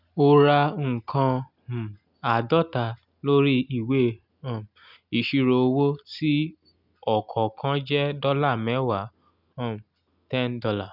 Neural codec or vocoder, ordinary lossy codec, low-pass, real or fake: none; none; 5.4 kHz; real